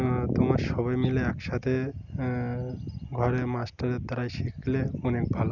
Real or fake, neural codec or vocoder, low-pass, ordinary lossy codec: real; none; 7.2 kHz; none